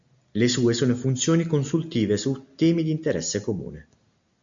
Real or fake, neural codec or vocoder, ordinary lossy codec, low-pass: real; none; MP3, 64 kbps; 7.2 kHz